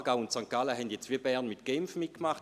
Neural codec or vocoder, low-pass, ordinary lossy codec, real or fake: none; 14.4 kHz; AAC, 96 kbps; real